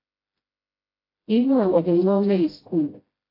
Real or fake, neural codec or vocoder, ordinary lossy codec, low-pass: fake; codec, 16 kHz, 0.5 kbps, FreqCodec, smaller model; AAC, 24 kbps; 5.4 kHz